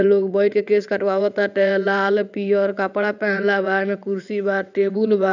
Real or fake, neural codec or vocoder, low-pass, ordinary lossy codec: fake; vocoder, 22.05 kHz, 80 mel bands, WaveNeXt; 7.2 kHz; none